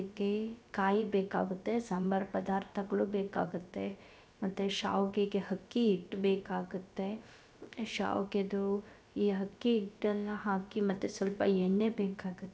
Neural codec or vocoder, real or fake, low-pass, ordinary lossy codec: codec, 16 kHz, about 1 kbps, DyCAST, with the encoder's durations; fake; none; none